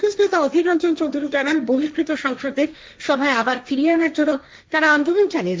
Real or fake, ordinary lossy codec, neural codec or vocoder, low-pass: fake; none; codec, 16 kHz, 1.1 kbps, Voila-Tokenizer; none